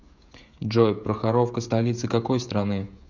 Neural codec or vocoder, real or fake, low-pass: codec, 16 kHz, 16 kbps, FreqCodec, smaller model; fake; 7.2 kHz